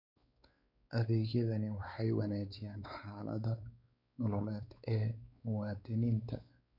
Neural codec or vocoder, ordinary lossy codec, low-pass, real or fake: codec, 16 kHz, 4 kbps, X-Codec, WavLM features, trained on Multilingual LibriSpeech; none; 5.4 kHz; fake